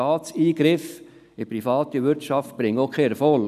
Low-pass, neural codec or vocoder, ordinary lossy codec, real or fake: 14.4 kHz; none; none; real